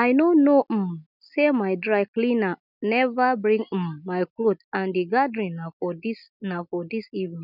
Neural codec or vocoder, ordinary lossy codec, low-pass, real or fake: none; none; 5.4 kHz; real